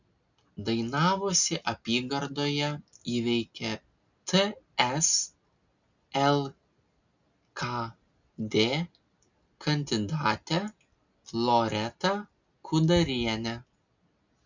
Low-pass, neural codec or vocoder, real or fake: 7.2 kHz; none; real